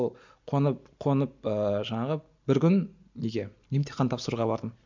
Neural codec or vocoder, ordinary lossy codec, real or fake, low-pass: none; MP3, 64 kbps; real; 7.2 kHz